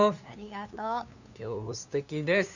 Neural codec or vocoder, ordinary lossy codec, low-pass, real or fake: codec, 16 kHz, 2 kbps, FunCodec, trained on LibriTTS, 25 frames a second; none; 7.2 kHz; fake